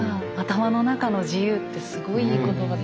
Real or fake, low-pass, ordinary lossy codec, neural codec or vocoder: real; none; none; none